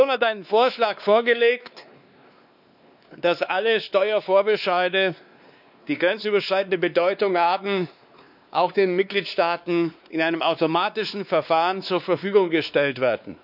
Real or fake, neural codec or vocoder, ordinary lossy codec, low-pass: fake; codec, 16 kHz, 2 kbps, X-Codec, WavLM features, trained on Multilingual LibriSpeech; none; 5.4 kHz